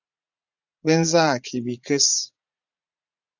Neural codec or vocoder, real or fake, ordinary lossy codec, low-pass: vocoder, 44.1 kHz, 80 mel bands, Vocos; fake; AAC, 48 kbps; 7.2 kHz